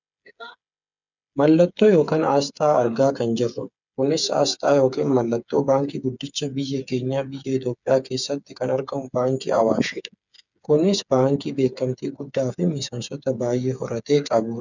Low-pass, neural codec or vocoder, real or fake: 7.2 kHz; codec, 16 kHz, 8 kbps, FreqCodec, smaller model; fake